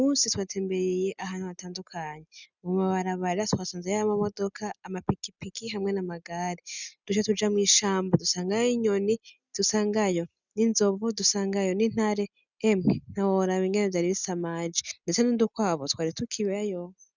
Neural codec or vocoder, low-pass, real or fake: none; 7.2 kHz; real